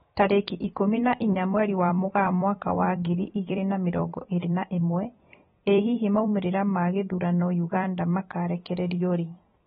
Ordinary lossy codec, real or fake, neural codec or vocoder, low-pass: AAC, 16 kbps; fake; vocoder, 44.1 kHz, 128 mel bands every 256 samples, BigVGAN v2; 19.8 kHz